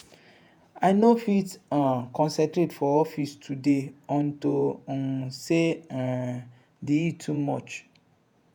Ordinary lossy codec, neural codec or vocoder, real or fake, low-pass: none; vocoder, 48 kHz, 128 mel bands, Vocos; fake; none